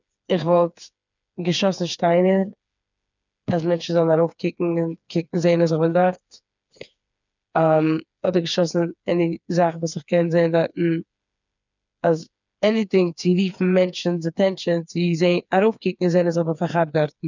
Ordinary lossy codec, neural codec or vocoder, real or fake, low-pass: none; codec, 16 kHz, 4 kbps, FreqCodec, smaller model; fake; 7.2 kHz